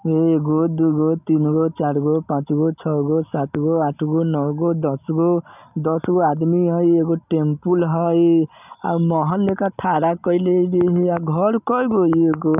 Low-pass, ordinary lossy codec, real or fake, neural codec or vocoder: 3.6 kHz; none; real; none